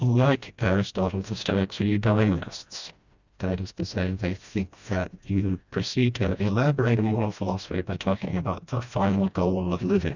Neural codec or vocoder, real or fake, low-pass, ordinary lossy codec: codec, 16 kHz, 1 kbps, FreqCodec, smaller model; fake; 7.2 kHz; Opus, 64 kbps